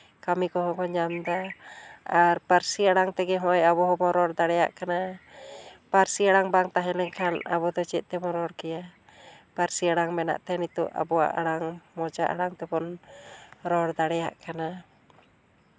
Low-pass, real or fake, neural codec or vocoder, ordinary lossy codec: none; real; none; none